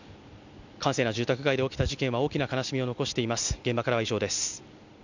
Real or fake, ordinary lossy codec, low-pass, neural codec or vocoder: real; none; 7.2 kHz; none